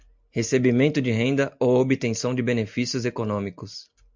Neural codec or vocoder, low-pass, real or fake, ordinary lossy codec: none; 7.2 kHz; real; MP3, 64 kbps